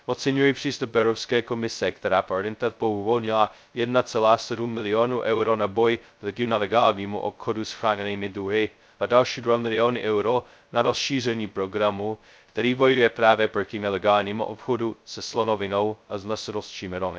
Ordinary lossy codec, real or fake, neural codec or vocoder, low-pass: none; fake; codec, 16 kHz, 0.2 kbps, FocalCodec; none